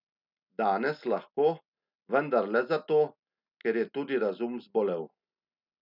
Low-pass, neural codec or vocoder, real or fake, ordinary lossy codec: 5.4 kHz; none; real; none